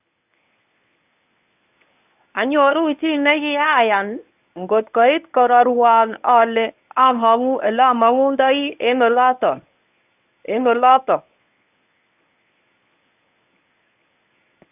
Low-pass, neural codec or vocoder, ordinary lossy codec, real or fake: 3.6 kHz; codec, 24 kHz, 0.9 kbps, WavTokenizer, medium speech release version 1; none; fake